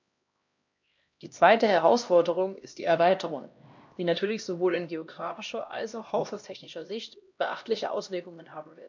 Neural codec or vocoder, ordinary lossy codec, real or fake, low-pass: codec, 16 kHz, 1 kbps, X-Codec, HuBERT features, trained on LibriSpeech; MP3, 64 kbps; fake; 7.2 kHz